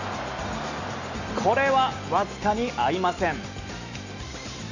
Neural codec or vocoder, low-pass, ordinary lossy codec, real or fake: none; 7.2 kHz; none; real